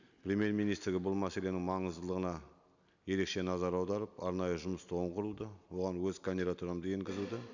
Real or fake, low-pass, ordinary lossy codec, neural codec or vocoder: real; 7.2 kHz; none; none